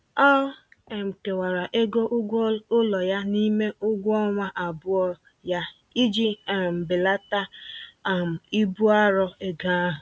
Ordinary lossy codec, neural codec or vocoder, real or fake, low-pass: none; none; real; none